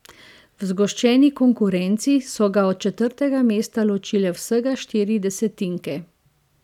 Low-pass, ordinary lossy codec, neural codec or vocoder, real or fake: 19.8 kHz; none; none; real